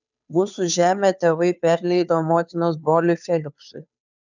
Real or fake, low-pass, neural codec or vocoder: fake; 7.2 kHz; codec, 16 kHz, 2 kbps, FunCodec, trained on Chinese and English, 25 frames a second